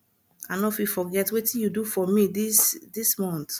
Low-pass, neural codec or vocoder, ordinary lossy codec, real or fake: none; none; none; real